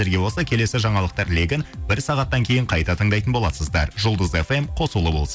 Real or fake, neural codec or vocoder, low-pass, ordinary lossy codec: real; none; none; none